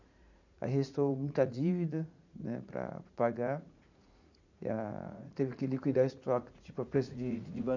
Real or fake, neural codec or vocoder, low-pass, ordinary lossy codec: fake; vocoder, 44.1 kHz, 80 mel bands, Vocos; 7.2 kHz; none